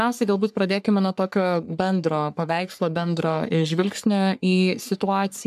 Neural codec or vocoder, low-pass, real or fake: codec, 44.1 kHz, 3.4 kbps, Pupu-Codec; 14.4 kHz; fake